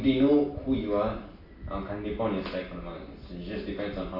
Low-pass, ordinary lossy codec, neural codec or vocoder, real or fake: 5.4 kHz; AAC, 32 kbps; none; real